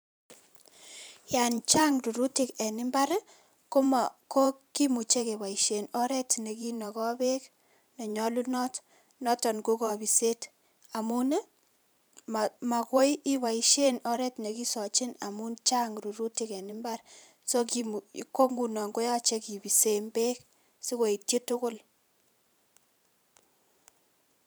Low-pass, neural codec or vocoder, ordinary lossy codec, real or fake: none; vocoder, 44.1 kHz, 128 mel bands every 512 samples, BigVGAN v2; none; fake